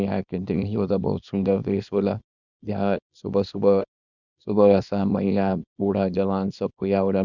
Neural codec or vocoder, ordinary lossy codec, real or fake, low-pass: codec, 24 kHz, 0.9 kbps, WavTokenizer, small release; none; fake; 7.2 kHz